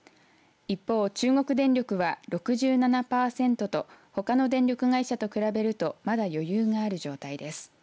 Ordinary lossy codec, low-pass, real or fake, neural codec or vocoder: none; none; real; none